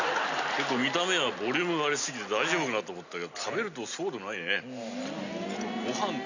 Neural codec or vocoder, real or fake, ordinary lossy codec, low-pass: none; real; none; 7.2 kHz